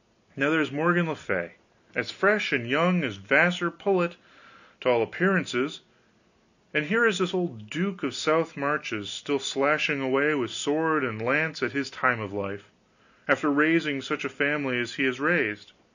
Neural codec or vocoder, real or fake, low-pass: none; real; 7.2 kHz